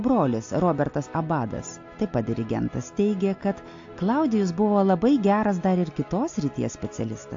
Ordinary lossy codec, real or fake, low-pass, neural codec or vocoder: AAC, 48 kbps; real; 7.2 kHz; none